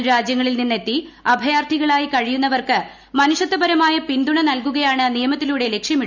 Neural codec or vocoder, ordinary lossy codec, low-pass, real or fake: none; none; 7.2 kHz; real